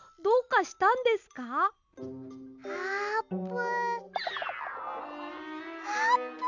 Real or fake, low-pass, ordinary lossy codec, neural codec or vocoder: real; 7.2 kHz; none; none